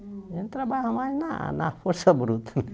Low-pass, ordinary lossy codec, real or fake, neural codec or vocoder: none; none; real; none